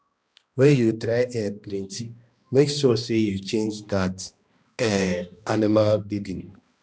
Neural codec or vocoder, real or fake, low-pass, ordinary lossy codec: codec, 16 kHz, 1 kbps, X-Codec, HuBERT features, trained on balanced general audio; fake; none; none